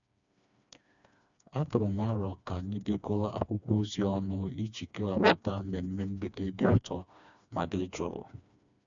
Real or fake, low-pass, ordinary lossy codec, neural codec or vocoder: fake; 7.2 kHz; none; codec, 16 kHz, 2 kbps, FreqCodec, smaller model